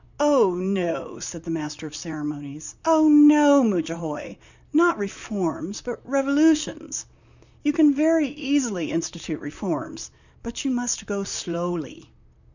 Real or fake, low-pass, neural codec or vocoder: fake; 7.2 kHz; vocoder, 44.1 kHz, 128 mel bands, Pupu-Vocoder